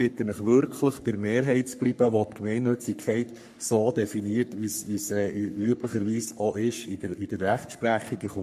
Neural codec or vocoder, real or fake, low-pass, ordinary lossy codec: codec, 44.1 kHz, 3.4 kbps, Pupu-Codec; fake; 14.4 kHz; MP3, 64 kbps